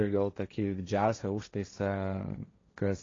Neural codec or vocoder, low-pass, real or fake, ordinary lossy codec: codec, 16 kHz, 1.1 kbps, Voila-Tokenizer; 7.2 kHz; fake; AAC, 32 kbps